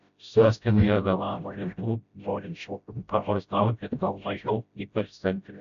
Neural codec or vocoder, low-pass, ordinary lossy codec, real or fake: codec, 16 kHz, 0.5 kbps, FreqCodec, smaller model; 7.2 kHz; MP3, 96 kbps; fake